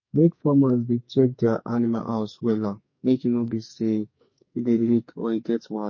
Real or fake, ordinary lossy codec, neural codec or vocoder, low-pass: fake; MP3, 32 kbps; codec, 44.1 kHz, 2.6 kbps, SNAC; 7.2 kHz